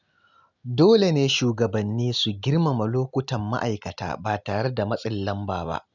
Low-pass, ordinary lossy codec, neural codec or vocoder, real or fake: 7.2 kHz; none; none; real